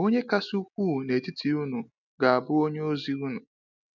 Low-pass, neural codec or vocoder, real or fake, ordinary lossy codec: 7.2 kHz; none; real; none